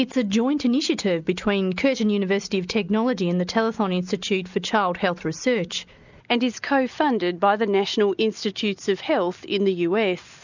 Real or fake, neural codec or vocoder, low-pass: real; none; 7.2 kHz